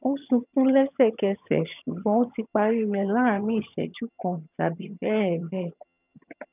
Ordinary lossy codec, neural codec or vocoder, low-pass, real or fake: none; vocoder, 22.05 kHz, 80 mel bands, HiFi-GAN; 3.6 kHz; fake